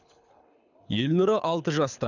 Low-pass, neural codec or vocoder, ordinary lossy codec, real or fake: 7.2 kHz; codec, 24 kHz, 6 kbps, HILCodec; none; fake